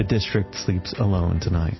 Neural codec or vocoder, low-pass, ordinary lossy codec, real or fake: none; 7.2 kHz; MP3, 24 kbps; real